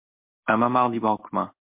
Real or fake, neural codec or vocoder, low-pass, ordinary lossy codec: real; none; 3.6 kHz; MP3, 32 kbps